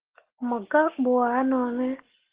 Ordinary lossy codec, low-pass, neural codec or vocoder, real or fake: Opus, 16 kbps; 3.6 kHz; none; real